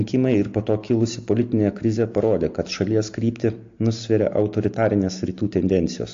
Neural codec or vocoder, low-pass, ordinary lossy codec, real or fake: none; 7.2 kHz; AAC, 48 kbps; real